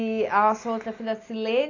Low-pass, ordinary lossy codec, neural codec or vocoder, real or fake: 7.2 kHz; AAC, 32 kbps; none; real